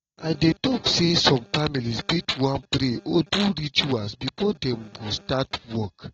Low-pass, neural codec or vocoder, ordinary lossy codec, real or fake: 7.2 kHz; none; AAC, 24 kbps; real